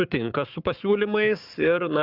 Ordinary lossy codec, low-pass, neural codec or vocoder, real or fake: Opus, 32 kbps; 5.4 kHz; codec, 44.1 kHz, 7.8 kbps, Pupu-Codec; fake